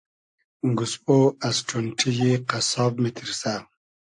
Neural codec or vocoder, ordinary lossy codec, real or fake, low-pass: none; AAC, 64 kbps; real; 9.9 kHz